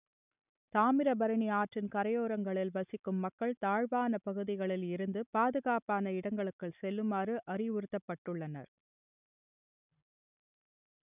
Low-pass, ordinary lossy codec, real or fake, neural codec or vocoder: 3.6 kHz; none; real; none